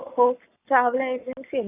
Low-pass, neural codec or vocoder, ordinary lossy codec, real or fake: 3.6 kHz; codec, 44.1 kHz, 7.8 kbps, Pupu-Codec; none; fake